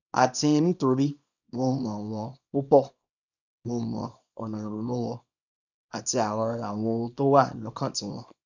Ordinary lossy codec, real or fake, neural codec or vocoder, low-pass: none; fake; codec, 24 kHz, 0.9 kbps, WavTokenizer, small release; 7.2 kHz